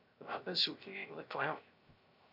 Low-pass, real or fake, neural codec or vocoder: 5.4 kHz; fake; codec, 16 kHz, 0.3 kbps, FocalCodec